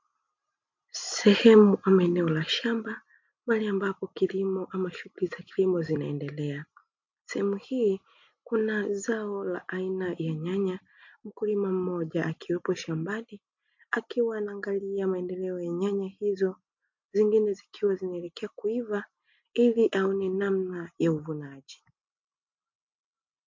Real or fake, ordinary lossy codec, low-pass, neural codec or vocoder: real; MP3, 48 kbps; 7.2 kHz; none